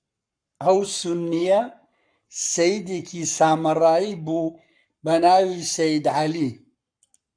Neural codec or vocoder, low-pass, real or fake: codec, 44.1 kHz, 7.8 kbps, Pupu-Codec; 9.9 kHz; fake